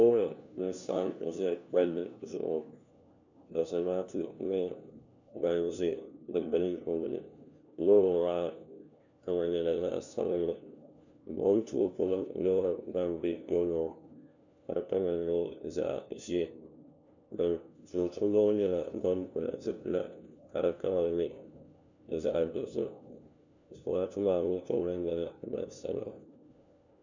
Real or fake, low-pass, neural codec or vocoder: fake; 7.2 kHz; codec, 16 kHz, 1 kbps, FunCodec, trained on LibriTTS, 50 frames a second